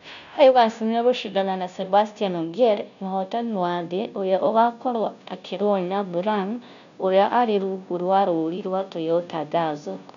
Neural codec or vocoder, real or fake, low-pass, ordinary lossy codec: codec, 16 kHz, 0.5 kbps, FunCodec, trained on Chinese and English, 25 frames a second; fake; 7.2 kHz; none